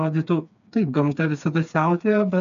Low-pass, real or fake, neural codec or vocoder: 7.2 kHz; fake; codec, 16 kHz, 4 kbps, FreqCodec, smaller model